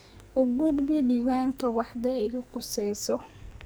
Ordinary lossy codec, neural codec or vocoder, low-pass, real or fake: none; codec, 44.1 kHz, 2.6 kbps, SNAC; none; fake